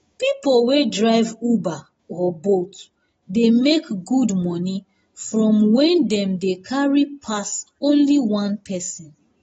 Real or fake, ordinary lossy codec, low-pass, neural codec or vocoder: real; AAC, 24 kbps; 19.8 kHz; none